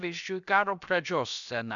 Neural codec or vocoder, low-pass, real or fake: codec, 16 kHz, about 1 kbps, DyCAST, with the encoder's durations; 7.2 kHz; fake